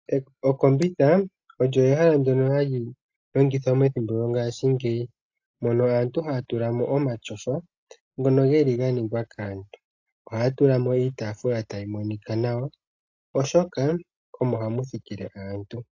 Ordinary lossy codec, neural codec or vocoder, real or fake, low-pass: AAC, 48 kbps; none; real; 7.2 kHz